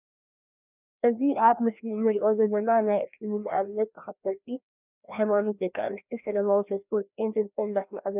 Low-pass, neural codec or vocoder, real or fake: 3.6 kHz; codec, 16 kHz, 1 kbps, FreqCodec, larger model; fake